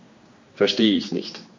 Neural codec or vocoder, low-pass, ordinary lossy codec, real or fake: codec, 16 kHz, 2 kbps, X-Codec, HuBERT features, trained on general audio; 7.2 kHz; MP3, 48 kbps; fake